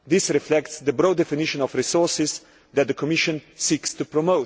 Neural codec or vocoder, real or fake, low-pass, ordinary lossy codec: none; real; none; none